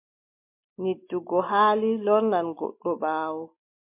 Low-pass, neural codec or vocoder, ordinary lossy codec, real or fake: 3.6 kHz; none; MP3, 24 kbps; real